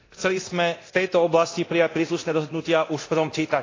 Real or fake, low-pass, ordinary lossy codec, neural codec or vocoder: fake; 7.2 kHz; AAC, 32 kbps; codec, 24 kHz, 0.9 kbps, DualCodec